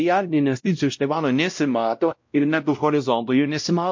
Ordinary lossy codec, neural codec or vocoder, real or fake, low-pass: MP3, 48 kbps; codec, 16 kHz, 0.5 kbps, X-Codec, WavLM features, trained on Multilingual LibriSpeech; fake; 7.2 kHz